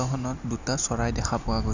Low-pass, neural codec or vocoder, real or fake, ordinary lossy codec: 7.2 kHz; none; real; none